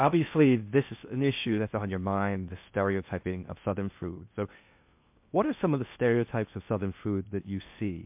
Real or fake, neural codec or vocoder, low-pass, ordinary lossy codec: fake; codec, 16 kHz in and 24 kHz out, 0.6 kbps, FocalCodec, streaming, 2048 codes; 3.6 kHz; MP3, 32 kbps